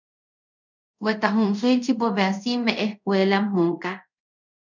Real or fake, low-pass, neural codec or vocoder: fake; 7.2 kHz; codec, 24 kHz, 0.5 kbps, DualCodec